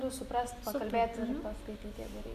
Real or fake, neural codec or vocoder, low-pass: real; none; 14.4 kHz